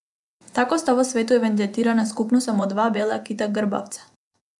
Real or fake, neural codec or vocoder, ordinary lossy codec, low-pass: fake; vocoder, 24 kHz, 100 mel bands, Vocos; none; 10.8 kHz